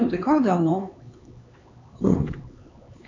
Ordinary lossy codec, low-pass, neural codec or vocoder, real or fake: AAC, 48 kbps; 7.2 kHz; codec, 16 kHz, 4 kbps, X-Codec, HuBERT features, trained on LibriSpeech; fake